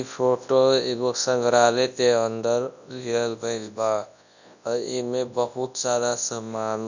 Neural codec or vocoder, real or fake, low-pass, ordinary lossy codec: codec, 24 kHz, 0.9 kbps, WavTokenizer, large speech release; fake; 7.2 kHz; none